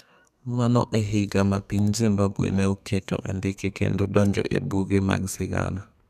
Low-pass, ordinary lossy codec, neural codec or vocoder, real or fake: 14.4 kHz; none; codec, 32 kHz, 1.9 kbps, SNAC; fake